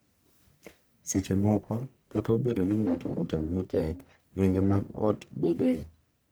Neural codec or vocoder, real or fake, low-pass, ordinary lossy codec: codec, 44.1 kHz, 1.7 kbps, Pupu-Codec; fake; none; none